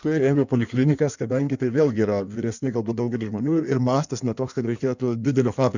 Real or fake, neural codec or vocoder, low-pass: fake; codec, 16 kHz in and 24 kHz out, 1.1 kbps, FireRedTTS-2 codec; 7.2 kHz